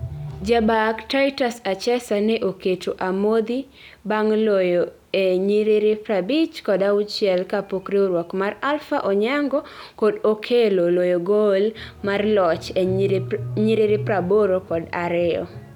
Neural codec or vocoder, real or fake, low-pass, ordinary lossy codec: none; real; 19.8 kHz; none